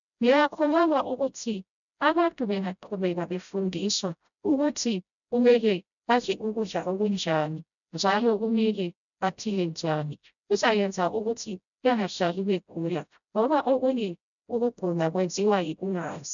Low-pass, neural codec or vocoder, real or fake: 7.2 kHz; codec, 16 kHz, 0.5 kbps, FreqCodec, smaller model; fake